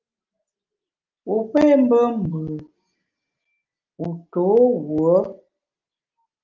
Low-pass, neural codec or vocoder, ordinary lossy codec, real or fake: 7.2 kHz; none; Opus, 24 kbps; real